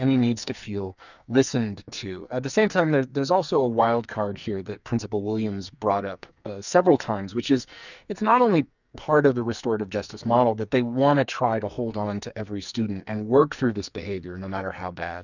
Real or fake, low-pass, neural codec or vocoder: fake; 7.2 kHz; codec, 44.1 kHz, 2.6 kbps, SNAC